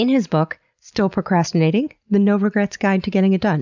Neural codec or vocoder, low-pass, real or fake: none; 7.2 kHz; real